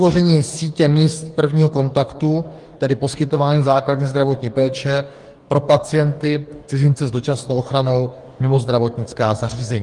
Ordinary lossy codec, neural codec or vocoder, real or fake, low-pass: Opus, 24 kbps; codec, 44.1 kHz, 2.6 kbps, DAC; fake; 10.8 kHz